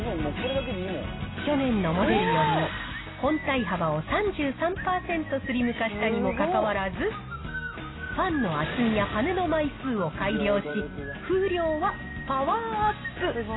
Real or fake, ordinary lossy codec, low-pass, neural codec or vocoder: real; AAC, 16 kbps; 7.2 kHz; none